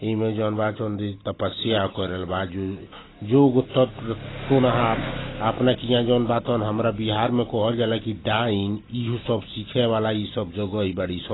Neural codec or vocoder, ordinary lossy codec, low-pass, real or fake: none; AAC, 16 kbps; 7.2 kHz; real